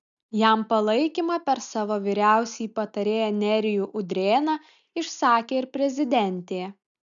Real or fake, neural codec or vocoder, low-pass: real; none; 7.2 kHz